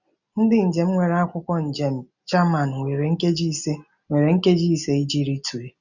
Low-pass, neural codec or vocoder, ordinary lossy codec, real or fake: 7.2 kHz; none; none; real